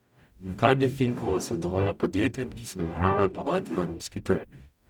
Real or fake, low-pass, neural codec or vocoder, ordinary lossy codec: fake; 19.8 kHz; codec, 44.1 kHz, 0.9 kbps, DAC; none